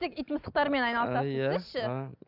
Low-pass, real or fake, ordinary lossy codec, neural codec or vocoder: 5.4 kHz; real; none; none